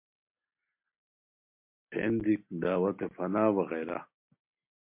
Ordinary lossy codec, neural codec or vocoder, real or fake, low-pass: MP3, 32 kbps; none; real; 3.6 kHz